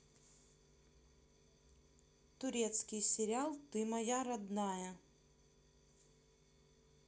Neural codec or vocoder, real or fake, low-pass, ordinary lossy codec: none; real; none; none